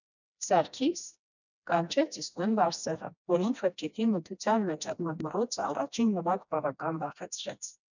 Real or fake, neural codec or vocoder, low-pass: fake; codec, 16 kHz, 1 kbps, FreqCodec, smaller model; 7.2 kHz